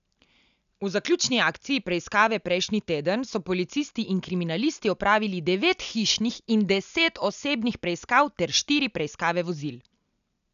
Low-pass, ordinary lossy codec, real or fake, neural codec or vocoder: 7.2 kHz; none; real; none